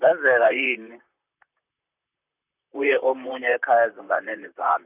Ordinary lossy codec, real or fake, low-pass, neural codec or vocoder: none; fake; 3.6 kHz; vocoder, 44.1 kHz, 128 mel bands, Pupu-Vocoder